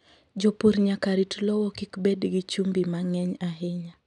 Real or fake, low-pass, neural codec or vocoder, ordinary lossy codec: real; 10.8 kHz; none; none